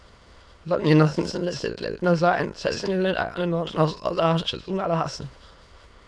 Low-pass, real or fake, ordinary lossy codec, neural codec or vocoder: none; fake; none; autoencoder, 22.05 kHz, a latent of 192 numbers a frame, VITS, trained on many speakers